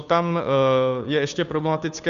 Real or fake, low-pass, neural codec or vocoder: fake; 7.2 kHz; codec, 16 kHz, 2 kbps, FunCodec, trained on Chinese and English, 25 frames a second